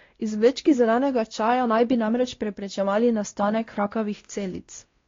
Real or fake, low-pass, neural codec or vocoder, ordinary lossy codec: fake; 7.2 kHz; codec, 16 kHz, 1 kbps, X-Codec, WavLM features, trained on Multilingual LibriSpeech; AAC, 32 kbps